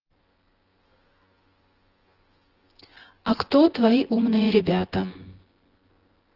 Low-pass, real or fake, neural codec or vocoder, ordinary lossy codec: 5.4 kHz; fake; vocoder, 24 kHz, 100 mel bands, Vocos; Opus, 16 kbps